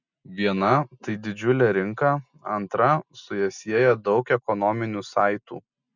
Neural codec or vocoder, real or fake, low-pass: none; real; 7.2 kHz